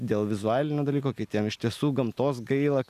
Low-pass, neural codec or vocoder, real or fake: 14.4 kHz; none; real